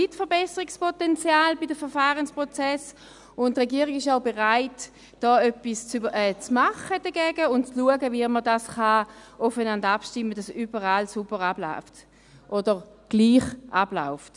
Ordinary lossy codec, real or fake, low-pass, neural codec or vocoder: none; real; 10.8 kHz; none